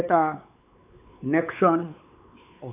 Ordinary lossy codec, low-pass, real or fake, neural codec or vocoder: none; 3.6 kHz; fake; codec, 16 kHz, 4 kbps, X-Codec, WavLM features, trained on Multilingual LibriSpeech